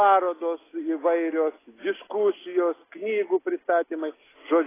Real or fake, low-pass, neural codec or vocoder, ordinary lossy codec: real; 3.6 kHz; none; AAC, 16 kbps